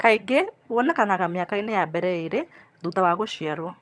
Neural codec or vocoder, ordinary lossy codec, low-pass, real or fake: vocoder, 22.05 kHz, 80 mel bands, HiFi-GAN; none; none; fake